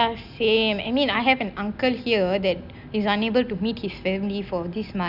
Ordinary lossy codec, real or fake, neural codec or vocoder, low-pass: none; real; none; 5.4 kHz